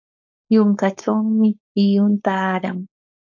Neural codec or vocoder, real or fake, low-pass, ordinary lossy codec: codec, 16 kHz, 4.8 kbps, FACodec; fake; 7.2 kHz; AAC, 48 kbps